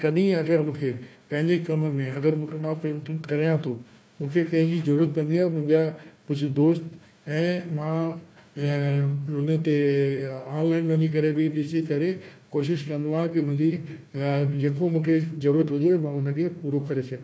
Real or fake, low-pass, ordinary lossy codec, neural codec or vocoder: fake; none; none; codec, 16 kHz, 1 kbps, FunCodec, trained on Chinese and English, 50 frames a second